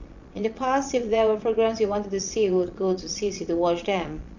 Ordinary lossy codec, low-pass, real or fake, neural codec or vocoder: none; 7.2 kHz; fake; vocoder, 22.05 kHz, 80 mel bands, Vocos